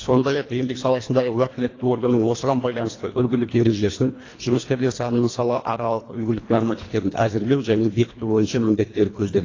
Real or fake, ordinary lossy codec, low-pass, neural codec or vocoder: fake; AAC, 32 kbps; 7.2 kHz; codec, 24 kHz, 1.5 kbps, HILCodec